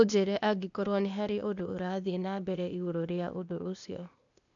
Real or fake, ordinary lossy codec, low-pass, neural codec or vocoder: fake; none; 7.2 kHz; codec, 16 kHz, 0.8 kbps, ZipCodec